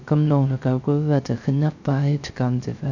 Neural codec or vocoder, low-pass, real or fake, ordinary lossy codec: codec, 16 kHz, 0.3 kbps, FocalCodec; 7.2 kHz; fake; none